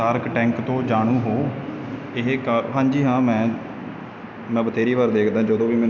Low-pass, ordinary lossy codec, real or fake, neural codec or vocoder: 7.2 kHz; none; real; none